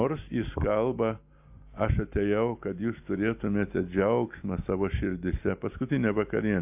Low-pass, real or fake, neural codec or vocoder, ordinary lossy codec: 3.6 kHz; real; none; AAC, 32 kbps